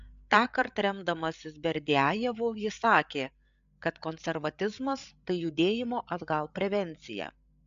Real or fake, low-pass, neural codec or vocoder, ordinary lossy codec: fake; 7.2 kHz; codec, 16 kHz, 8 kbps, FreqCodec, larger model; AAC, 96 kbps